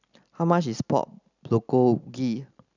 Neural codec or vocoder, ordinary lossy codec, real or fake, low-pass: none; none; real; 7.2 kHz